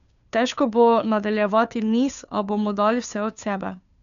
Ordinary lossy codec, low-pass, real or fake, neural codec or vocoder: none; 7.2 kHz; fake; codec, 16 kHz, 2 kbps, FunCodec, trained on Chinese and English, 25 frames a second